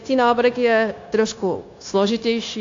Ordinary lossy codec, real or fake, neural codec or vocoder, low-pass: AAC, 48 kbps; fake; codec, 16 kHz, 0.9 kbps, LongCat-Audio-Codec; 7.2 kHz